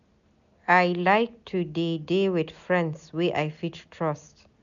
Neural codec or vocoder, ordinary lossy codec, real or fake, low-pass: none; none; real; 7.2 kHz